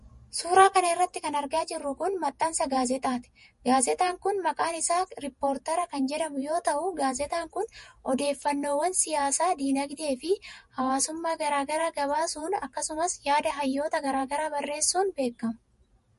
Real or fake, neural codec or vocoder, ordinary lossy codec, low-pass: fake; vocoder, 48 kHz, 128 mel bands, Vocos; MP3, 48 kbps; 14.4 kHz